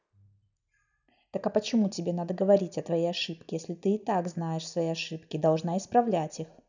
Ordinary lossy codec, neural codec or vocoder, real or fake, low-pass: none; none; real; 7.2 kHz